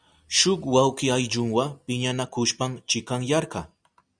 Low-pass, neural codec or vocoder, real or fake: 9.9 kHz; none; real